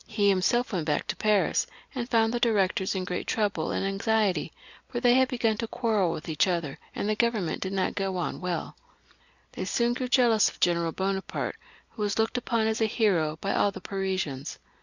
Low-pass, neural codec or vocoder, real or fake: 7.2 kHz; none; real